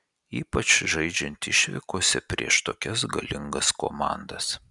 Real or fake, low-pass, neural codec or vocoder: real; 10.8 kHz; none